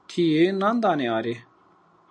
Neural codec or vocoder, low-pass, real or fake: none; 9.9 kHz; real